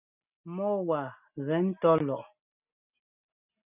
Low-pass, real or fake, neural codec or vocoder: 3.6 kHz; real; none